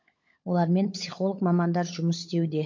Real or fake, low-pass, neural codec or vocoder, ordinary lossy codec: fake; 7.2 kHz; codec, 16 kHz, 16 kbps, FunCodec, trained on LibriTTS, 50 frames a second; MP3, 48 kbps